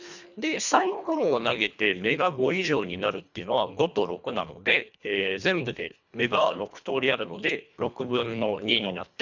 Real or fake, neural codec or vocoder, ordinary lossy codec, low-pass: fake; codec, 24 kHz, 1.5 kbps, HILCodec; none; 7.2 kHz